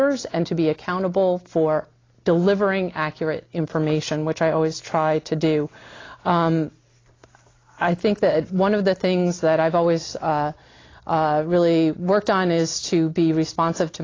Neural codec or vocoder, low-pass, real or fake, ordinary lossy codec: none; 7.2 kHz; real; AAC, 32 kbps